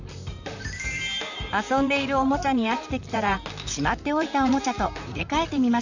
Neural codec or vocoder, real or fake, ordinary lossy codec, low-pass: vocoder, 44.1 kHz, 128 mel bands, Pupu-Vocoder; fake; none; 7.2 kHz